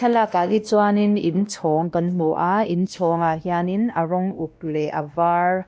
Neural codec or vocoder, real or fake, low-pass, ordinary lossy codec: codec, 16 kHz, 1 kbps, X-Codec, WavLM features, trained on Multilingual LibriSpeech; fake; none; none